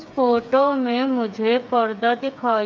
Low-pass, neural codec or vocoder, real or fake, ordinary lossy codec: none; codec, 16 kHz, 8 kbps, FreqCodec, smaller model; fake; none